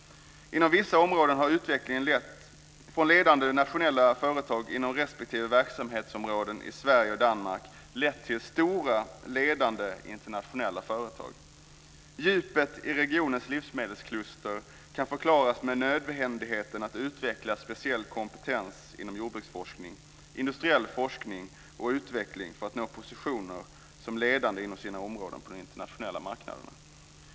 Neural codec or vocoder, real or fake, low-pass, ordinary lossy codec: none; real; none; none